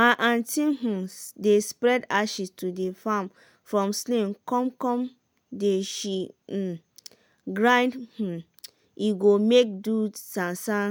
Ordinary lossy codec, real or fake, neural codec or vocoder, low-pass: none; real; none; none